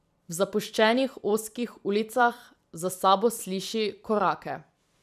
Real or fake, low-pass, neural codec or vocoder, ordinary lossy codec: fake; 14.4 kHz; vocoder, 44.1 kHz, 128 mel bands every 256 samples, BigVGAN v2; none